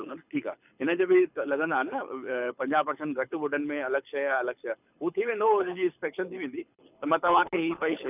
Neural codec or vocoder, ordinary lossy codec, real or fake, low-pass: codec, 24 kHz, 6 kbps, HILCodec; none; fake; 3.6 kHz